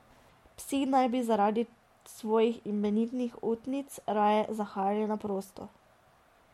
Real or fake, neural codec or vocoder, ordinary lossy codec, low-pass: real; none; MP3, 64 kbps; 19.8 kHz